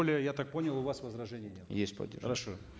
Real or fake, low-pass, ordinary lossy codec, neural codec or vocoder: real; none; none; none